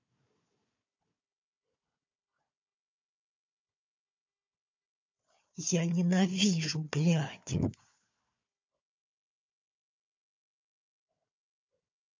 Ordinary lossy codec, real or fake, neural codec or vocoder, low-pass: MP3, 48 kbps; fake; codec, 16 kHz, 4 kbps, FunCodec, trained on Chinese and English, 50 frames a second; 7.2 kHz